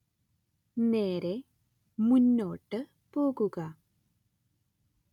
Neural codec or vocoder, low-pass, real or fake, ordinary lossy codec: none; 19.8 kHz; real; none